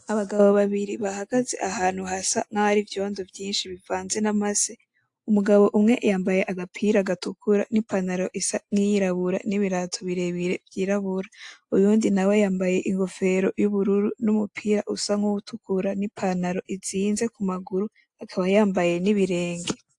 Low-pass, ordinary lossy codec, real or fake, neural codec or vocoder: 10.8 kHz; AAC, 64 kbps; real; none